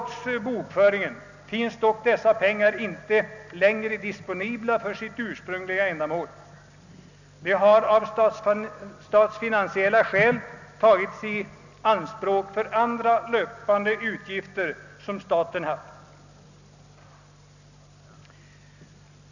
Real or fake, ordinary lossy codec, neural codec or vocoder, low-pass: real; none; none; 7.2 kHz